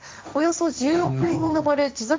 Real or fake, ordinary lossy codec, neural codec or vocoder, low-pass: fake; none; codec, 16 kHz, 1.1 kbps, Voila-Tokenizer; none